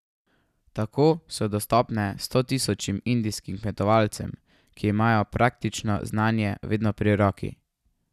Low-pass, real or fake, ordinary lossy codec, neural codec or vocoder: 14.4 kHz; real; none; none